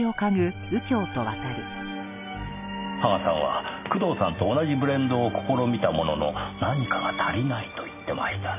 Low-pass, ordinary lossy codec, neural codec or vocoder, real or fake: 3.6 kHz; none; none; real